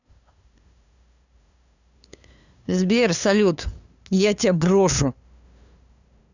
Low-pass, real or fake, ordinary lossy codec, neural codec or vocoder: 7.2 kHz; fake; none; codec, 16 kHz, 2 kbps, FunCodec, trained on LibriTTS, 25 frames a second